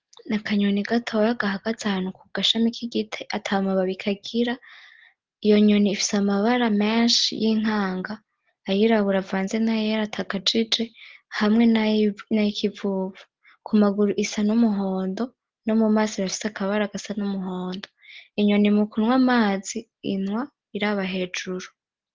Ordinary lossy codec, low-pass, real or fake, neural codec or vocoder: Opus, 16 kbps; 7.2 kHz; real; none